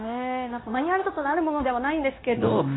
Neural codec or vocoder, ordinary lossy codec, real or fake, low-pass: codec, 16 kHz, 0.9 kbps, LongCat-Audio-Codec; AAC, 16 kbps; fake; 7.2 kHz